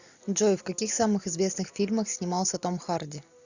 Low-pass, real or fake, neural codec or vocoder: 7.2 kHz; real; none